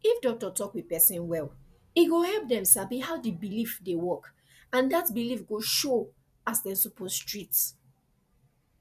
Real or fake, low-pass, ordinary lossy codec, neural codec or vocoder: real; 14.4 kHz; none; none